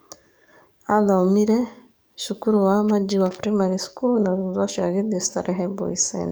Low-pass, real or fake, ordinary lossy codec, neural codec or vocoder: none; fake; none; codec, 44.1 kHz, 7.8 kbps, DAC